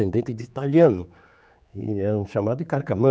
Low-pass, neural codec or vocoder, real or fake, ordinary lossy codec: none; codec, 16 kHz, 4 kbps, X-Codec, HuBERT features, trained on general audio; fake; none